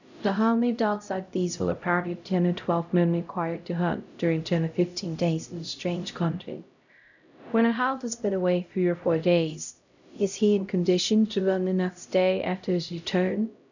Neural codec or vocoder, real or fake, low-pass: codec, 16 kHz, 0.5 kbps, X-Codec, HuBERT features, trained on LibriSpeech; fake; 7.2 kHz